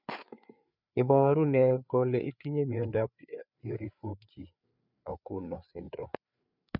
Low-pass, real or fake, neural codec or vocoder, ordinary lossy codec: 5.4 kHz; fake; codec, 16 kHz, 4 kbps, FreqCodec, larger model; none